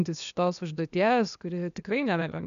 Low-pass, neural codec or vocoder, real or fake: 7.2 kHz; codec, 16 kHz, 0.8 kbps, ZipCodec; fake